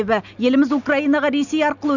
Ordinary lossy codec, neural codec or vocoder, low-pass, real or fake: none; none; 7.2 kHz; real